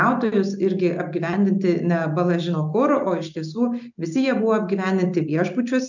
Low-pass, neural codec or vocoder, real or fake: 7.2 kHz; none; real